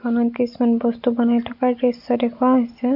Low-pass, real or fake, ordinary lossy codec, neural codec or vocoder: 5.4 kHz; real; none; none